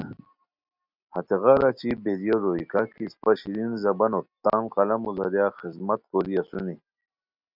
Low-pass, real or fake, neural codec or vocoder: 5.4 kHz; real; none